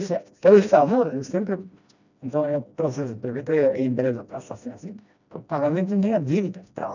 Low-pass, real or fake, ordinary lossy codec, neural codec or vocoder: 7.2 kHz; fake; none; codec, 16 kHz, 1 kbps, FreqCodec, smaller model